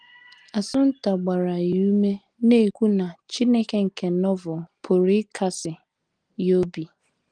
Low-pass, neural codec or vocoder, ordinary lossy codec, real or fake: 9.9 kHz; none; Opus, 24 kbps; real